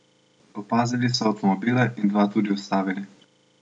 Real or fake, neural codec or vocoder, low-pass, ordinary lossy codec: real; none; 9.9 kHz; none